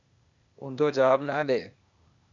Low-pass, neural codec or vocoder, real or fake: 7.2 kHz; codec, 16 kHz, 0.8 kbps, ZipCodec; fake